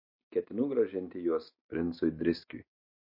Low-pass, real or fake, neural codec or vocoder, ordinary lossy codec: 5.4 kHz; real; none; MP3, 32 kbps